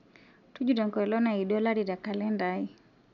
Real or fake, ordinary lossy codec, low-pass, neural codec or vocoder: real; none; 7.2 kHz; none